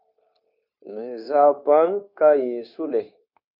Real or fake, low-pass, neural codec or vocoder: fake; 5.4 kHz; codec, 16 kHz, 0.9 kbps, LongCat-Audio-Codec